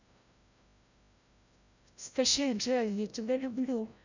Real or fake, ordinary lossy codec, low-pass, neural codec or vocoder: fake; none; 7.2 kHz; codec, 16 kHz, 0.5 kbps, FreqCodec, larger model